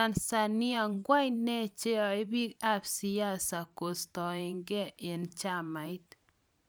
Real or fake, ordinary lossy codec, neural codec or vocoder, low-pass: fake; none; vocoder, 44.1 kHz, 128 mel bands every 512 samples, BigVGAN v2; none